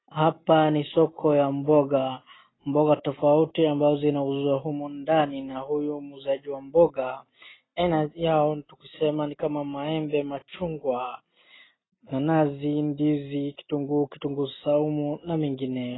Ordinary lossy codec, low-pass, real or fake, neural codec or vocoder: AAC, 16 kbps; 7.2 kHz; real; none